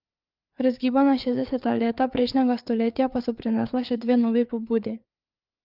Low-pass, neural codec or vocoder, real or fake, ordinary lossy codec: 5.4 kHz; codec, 16 kHz, 4 kbps, FreqCodec, larger model; fake; Opus, 24 kbps